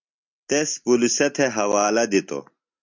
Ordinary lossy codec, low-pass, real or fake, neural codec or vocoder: MP3, 64 kbps; 7.2 kHz; real; none